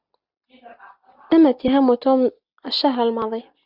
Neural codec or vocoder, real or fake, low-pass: none; real; 5.4 kHz